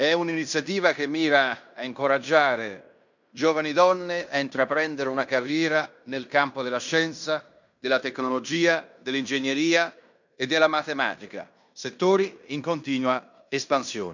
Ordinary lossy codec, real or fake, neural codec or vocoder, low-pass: none; fake; codec, 16 kHz in and 24 kHz out, 0.9 kbps, LongCat-Audio-Codec, fine tuned four codebook decoder; 7.2 kHz